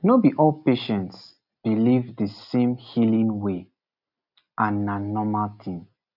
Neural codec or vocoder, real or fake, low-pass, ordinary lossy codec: none; real; 5.4 kHz; none